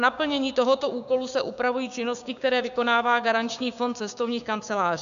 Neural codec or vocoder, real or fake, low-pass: codec, 16 kHz, 6 kbps, DAC; fake; 7.2 kHz